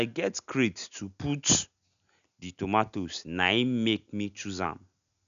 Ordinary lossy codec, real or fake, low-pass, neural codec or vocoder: none; real; 7.2 kHz; none